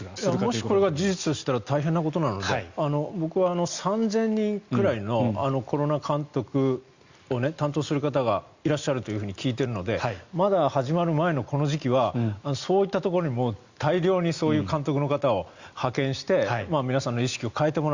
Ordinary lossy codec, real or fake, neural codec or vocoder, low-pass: Opus, 64 kbps; real; none; 7.2 kHz